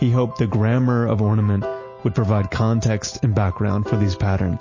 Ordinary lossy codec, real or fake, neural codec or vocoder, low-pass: MP3, 32 kbps; real; none; 7.2 kHz